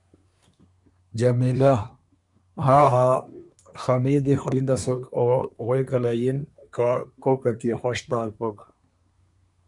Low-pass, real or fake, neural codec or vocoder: 10.8 kHz; fake; codec, 24 kHz, 1 kbps, SNAC